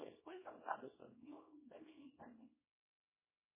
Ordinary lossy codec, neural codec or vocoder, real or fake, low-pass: MP3, 16 kbps; codec, 24 kHz, 0.9 kbps, WavTokenizer, small release; fake; 3.6 kHz